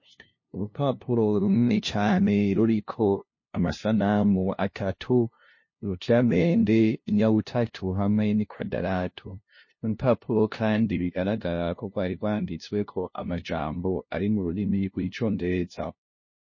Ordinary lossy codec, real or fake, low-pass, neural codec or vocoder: MP3, 32 kbps; fake; 7.2 kHz; codec, 16 kHz, 0.5 kbps, FunCodec, trained on LibriTTS, 25 frames a second